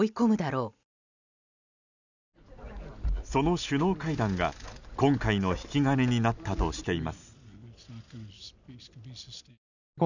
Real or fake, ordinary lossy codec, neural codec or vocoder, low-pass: real; none; none; 7.2 kHz